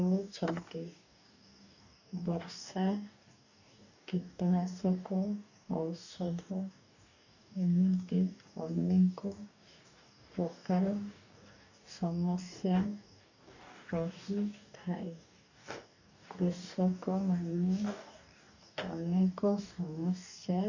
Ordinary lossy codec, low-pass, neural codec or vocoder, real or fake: none; 7.2 kHz; codec, 44.1 kHz, 2.6 kbps, DAC; fake